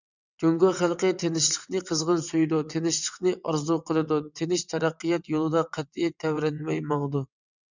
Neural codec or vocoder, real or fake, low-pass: vocoder, 22.05 kHz, 80 mel bands, WaveNeXt; fake; 7.2 kHz